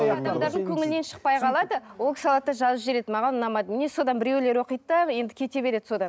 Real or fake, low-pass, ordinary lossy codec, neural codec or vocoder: real; none; none; none